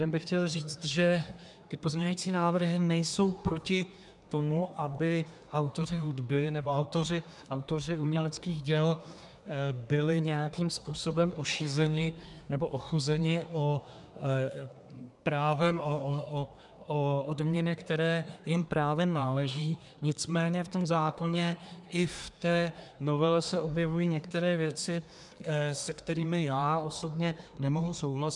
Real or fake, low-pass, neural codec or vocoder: fake; 10.8 kHz; codec, 24 kHz, 1 kbps, SNAC